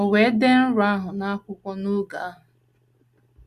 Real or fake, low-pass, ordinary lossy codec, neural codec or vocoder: real; 14.4 kHz; Opus, 64 kbps; none